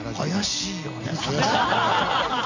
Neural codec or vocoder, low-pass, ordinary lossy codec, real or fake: none; 7.2 kHz; none; real